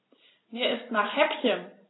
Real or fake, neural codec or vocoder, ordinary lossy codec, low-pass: real; none; AAC, 16 kbps; 7.2 kHz